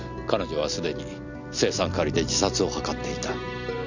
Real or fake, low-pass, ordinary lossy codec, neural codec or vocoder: real; 7.2 kHz; none; none